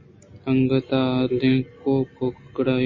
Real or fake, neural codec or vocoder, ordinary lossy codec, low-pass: real; none; MP3, 32 kbps; 7.2 kHz